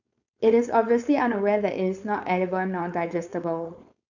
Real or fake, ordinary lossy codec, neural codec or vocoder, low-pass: fake; none; codec, 16 kHz, 4.8 kbps, FACodec; 7.2 kHz